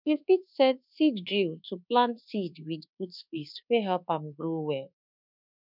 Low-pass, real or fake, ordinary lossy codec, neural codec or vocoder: 5.4 kHz; fake; none; codec, 24 kHz, 1.2 kbps, DualCodec